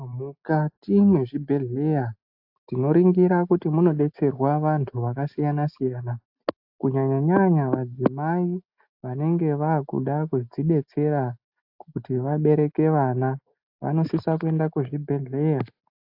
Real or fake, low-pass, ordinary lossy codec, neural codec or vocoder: real; 5.4 kHz; AAC, 48 kbps; none